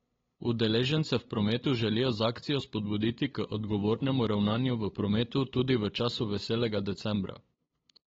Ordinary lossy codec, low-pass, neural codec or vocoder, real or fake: AAC, 24 kbps; 7.2 kHz; codec, 16 kHz, 8 kbps, FunCodec, trained on LibriTTS, 25 frames a second; fake